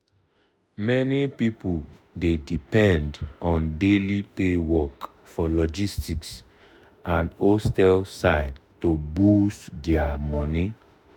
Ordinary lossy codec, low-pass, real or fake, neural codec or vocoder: none; 19.8 kHz; fake; autoencoder, 48 kHz, 32 numbers a frame, DAC-VAE, trained on Japanese speech